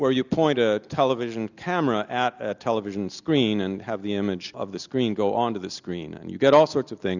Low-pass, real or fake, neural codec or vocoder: 7.2 kHz; real; none